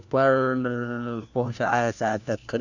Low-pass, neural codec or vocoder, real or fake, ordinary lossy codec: 7.2 kHz; codec, 16 kHz, 1 kbps, FunCodec, trained on LibriTTS, 50 frames a second; fake; none